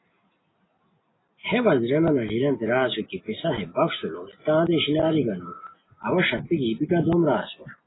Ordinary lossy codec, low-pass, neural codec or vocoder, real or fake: AAC, 16 kbps; 7.2 kHz; none; real